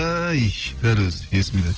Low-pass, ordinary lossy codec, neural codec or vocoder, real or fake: 7.2 kHz; Opus, 16 kbps; none; real